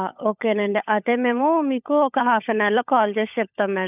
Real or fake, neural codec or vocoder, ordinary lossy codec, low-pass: fake; codec, 16 kHz, 16 kbps, FunCodec, trained on LibriTTS, 50 frames a second; none; 3.6 kHz